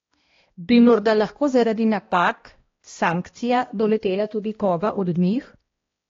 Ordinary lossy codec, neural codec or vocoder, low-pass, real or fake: AAC, 32 kbps; codec, 16 kHz, 1 kbps, X-Codec, HuBERT features, trained on balanced general audio; 7.2 kHz; fake